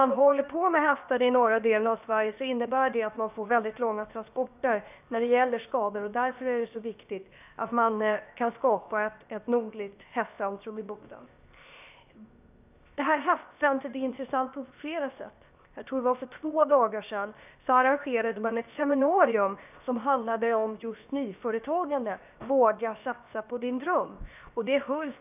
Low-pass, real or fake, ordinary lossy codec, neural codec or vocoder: 3.6 kHz; fake; none; codec, 16 kHz, 0.7 kbps, FocalCodec